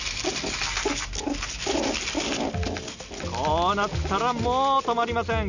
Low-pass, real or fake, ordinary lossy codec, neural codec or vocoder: 7.2 kHz; real; none; none